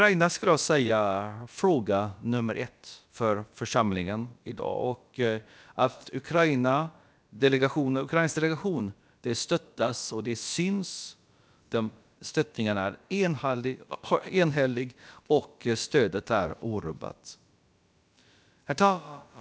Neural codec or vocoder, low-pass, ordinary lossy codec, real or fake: codec, 16 kHz, about 1 kbps, DyCAST, with the encoder's durations; none; none; fake